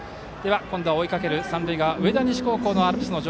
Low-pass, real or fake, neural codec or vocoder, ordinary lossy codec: none; real; none; none